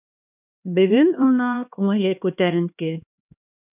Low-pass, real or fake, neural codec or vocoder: 3.6 kHz; fake; codec, 16 kHz, 2 kbps, X-Codec, HuBERT features, trained on LibriSpeech